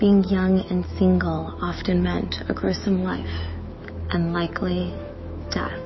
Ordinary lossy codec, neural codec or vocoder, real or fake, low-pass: MP3, 24 kbps; autoencoder, 48 kHz, 128 numbers a frame, DAC-VAE, trained on Japanese speech; fake; 7.2 kHz